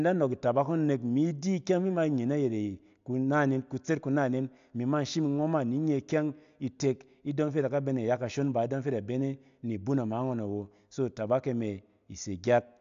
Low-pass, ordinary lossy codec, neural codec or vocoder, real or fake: 7.2 kHz; AAC, 64 kbps; none; real